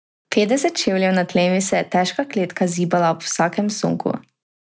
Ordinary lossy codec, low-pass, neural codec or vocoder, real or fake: none; none; none; real